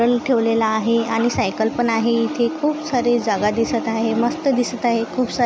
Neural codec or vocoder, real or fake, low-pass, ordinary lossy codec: none; real; none; none